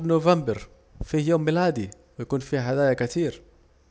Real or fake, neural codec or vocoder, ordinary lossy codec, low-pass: real; none; none; none